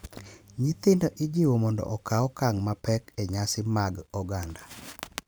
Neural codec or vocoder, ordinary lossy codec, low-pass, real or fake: vocoder, 44.1 kHz, 128 mel bands every 512 samples, BigVGAN v2; none; none; fake